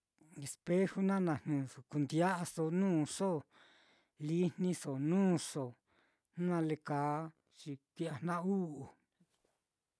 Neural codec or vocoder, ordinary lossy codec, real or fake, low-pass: none; none; real; none